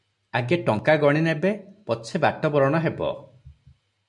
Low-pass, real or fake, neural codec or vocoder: 10.8 kHz; real; none